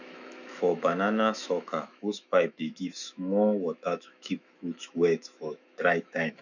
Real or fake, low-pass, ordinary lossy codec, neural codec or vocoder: fake; 7.2 kHz; none; autoencoder, 48 kHz, 128 numbers a frame, DAC-VAE, trained on Japanese speech